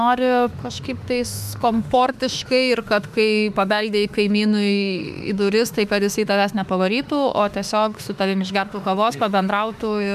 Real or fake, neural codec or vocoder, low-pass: fake; autoencoder, 48 kHz, 32 numbers a frame, DAC-VAE, trained on Japanese speech; 14.4 kHz